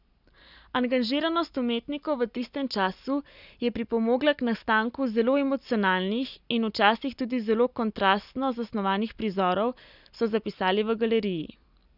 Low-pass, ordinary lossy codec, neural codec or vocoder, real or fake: 5.4 kHz; none; none; real